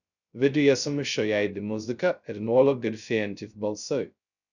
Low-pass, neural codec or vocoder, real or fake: 7.2 kHz; codec, 16 kHz, 0.2 kbps, FocalCodec; fake